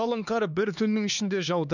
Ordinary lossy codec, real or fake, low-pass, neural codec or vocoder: none; fake; 7.2 kHz; codec, 16 kHz, 2 kbps, X-Codec, HuBERT features, trained on LibriSpeech